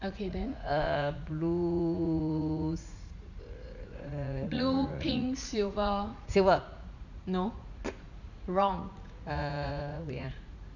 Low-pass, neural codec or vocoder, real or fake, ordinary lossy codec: 7.2 kHz; vocoder, 44.1 kHz, 80 mel bands, Vocos; fake; none